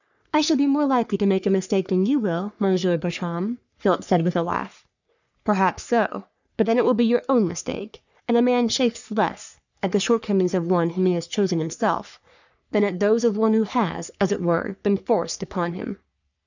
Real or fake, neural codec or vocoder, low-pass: fake; codec, 44.1 kHz, 3.4 kbps, Pupu-Codec; 7.2 kHz